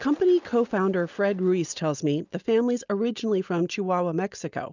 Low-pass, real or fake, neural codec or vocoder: 7.2 kHz; real; none